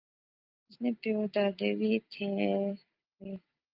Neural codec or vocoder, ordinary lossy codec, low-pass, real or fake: none; Opus, 24 kbps; 5.4 kHz; real